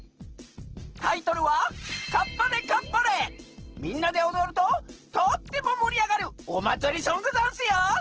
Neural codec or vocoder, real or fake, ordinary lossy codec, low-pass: none; real; Opus, 16 kbps; 7.2 kHz